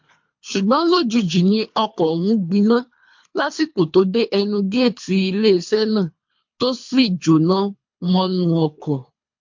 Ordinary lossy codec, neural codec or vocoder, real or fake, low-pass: MP3, 48 kbps; codec, 24 kHz, 3 kbps, HILCodec; fake; 7.2 kHz